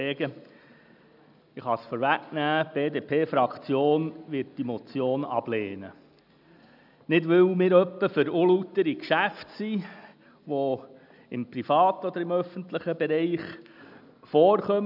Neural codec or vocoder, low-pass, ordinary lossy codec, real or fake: none; 5.4 kHz; none; real